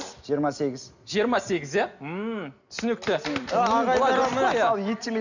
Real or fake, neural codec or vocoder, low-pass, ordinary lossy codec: real; none; 7.2 kHz; none